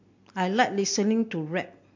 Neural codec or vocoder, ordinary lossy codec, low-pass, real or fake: none; MP3, 48 kbps; 7.2 kHz; real